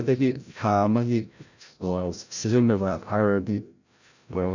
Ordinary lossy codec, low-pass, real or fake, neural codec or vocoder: none; 7.2 kHz; fake; codec, 16 kHz, 0.5 kbps, FreqCodec, larger model